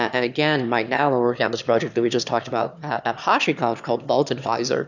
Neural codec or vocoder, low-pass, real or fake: autoencoder, 22.05 kHz, a latent of 192 numbers a frame, VITS, trained on one speaker; 7.2 kHz; fake